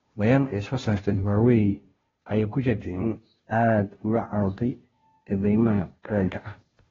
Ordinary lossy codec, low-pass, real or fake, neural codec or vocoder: AAC, 24 kbps; 7.2 kHz; fake; codec, 16 kHz, 0.5 kbps, FunCodec, trained on Chinese and English, 25 frames a second